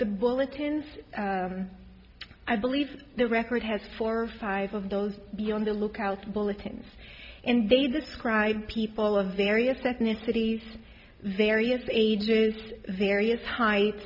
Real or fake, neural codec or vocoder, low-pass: real; none; 5.4 kHz